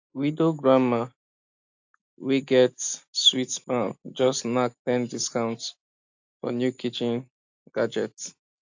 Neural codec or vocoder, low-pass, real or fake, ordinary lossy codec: none; 7.2 kHz; real; AAC, 48 kbps